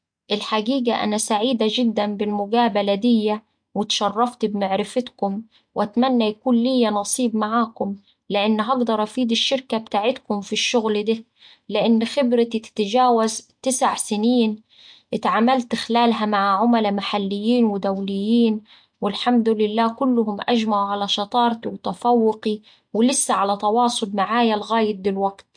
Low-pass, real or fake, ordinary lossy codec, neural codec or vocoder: 9.9 kHz; real; MP3, 64 kbps; none